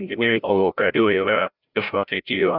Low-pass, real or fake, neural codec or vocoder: 5.4 kHz; fake; codec, 16 kHz, 0.5 kbps, FreqCodec, larger model